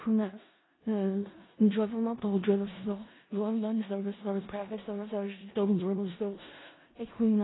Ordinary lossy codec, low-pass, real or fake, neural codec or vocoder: AAC, 16 kbps; 7.2 kHz; fake; codec, 16 kHz in and 24 kHz out, 0.4 kbps, LongCat-Audio-Codec, four codebook decoder